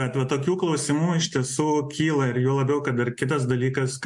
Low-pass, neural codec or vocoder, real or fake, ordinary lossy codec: 10.8 kHz; none; real; MP3, 64 kbps